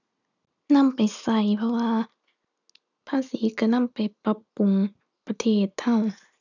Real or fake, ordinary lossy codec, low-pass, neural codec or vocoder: real; none; 7.2 kHz; none